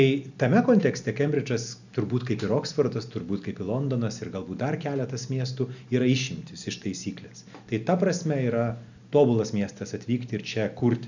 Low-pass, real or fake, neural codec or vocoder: 7.2 kHz; real; none